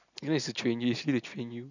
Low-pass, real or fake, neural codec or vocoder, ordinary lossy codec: 7.2 kHz; real; none; none